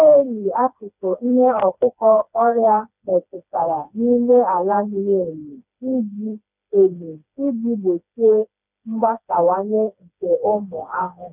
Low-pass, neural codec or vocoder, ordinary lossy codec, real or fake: 3.6 kHz; codec, 16 kHz, 2 kbps, FreqCodec, smaller model; none; fake